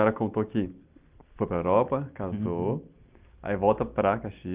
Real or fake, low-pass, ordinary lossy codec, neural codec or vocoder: real; 3.6 kHz; Opus, 24 kbps; none